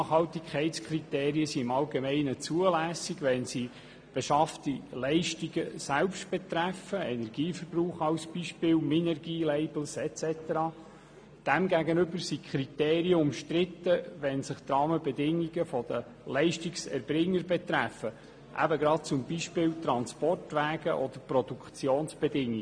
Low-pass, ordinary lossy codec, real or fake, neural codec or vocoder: 9.9 kHz; MP3, 64 kbps; real; none